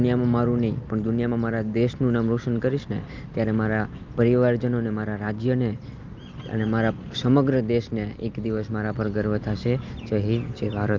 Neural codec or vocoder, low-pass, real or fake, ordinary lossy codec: none; 7.2 kHz; real; Opus, 24 kbps